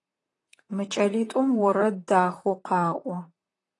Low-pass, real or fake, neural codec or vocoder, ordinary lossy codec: 10.8 kHz; fake; vocoder, 44.1 kHz, 128 mel bands, Pupu-Vocoder; AAC, 32 kbps